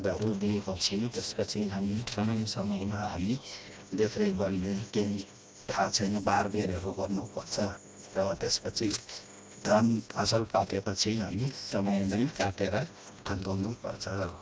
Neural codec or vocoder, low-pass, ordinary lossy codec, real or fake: codec, 16 kHz, 1 kbps, FreqCodec, smaller model; none; none; fake